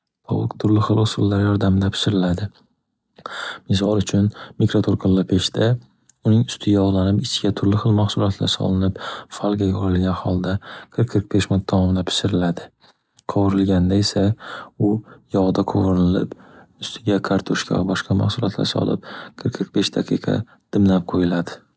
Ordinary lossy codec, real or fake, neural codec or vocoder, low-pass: none; real; none; none